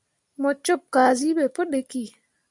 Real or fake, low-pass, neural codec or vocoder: real; 10.8 kHz; none